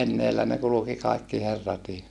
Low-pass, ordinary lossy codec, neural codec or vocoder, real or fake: none; none; none; real